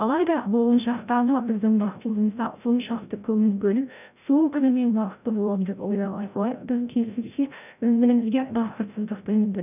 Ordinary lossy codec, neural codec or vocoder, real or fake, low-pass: none; codec, 16 kHz, 0.5 kbps, FreqCodec, larger model; fake; 3.6 kHz